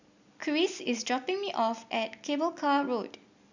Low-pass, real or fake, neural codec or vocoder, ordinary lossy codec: 7.2 kHz; real; none; none